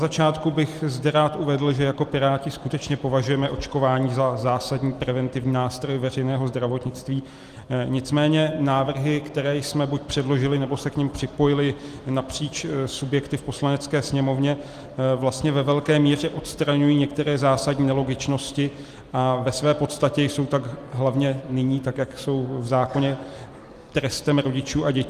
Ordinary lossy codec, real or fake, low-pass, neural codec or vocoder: Opus, 24 kbps; real; 14.4 kHz; none